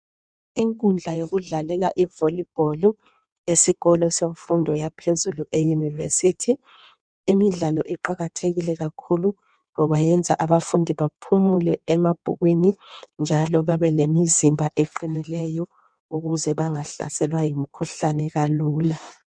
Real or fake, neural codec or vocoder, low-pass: fake; codec, 16 kHz in and 24 kHz out, 1.1 kbps, FireRedTTS-2 codec; 9.9 kHz